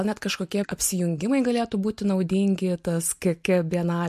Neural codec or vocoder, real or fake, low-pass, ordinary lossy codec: none; real; 14.4 kHz; MP3, 64 kbps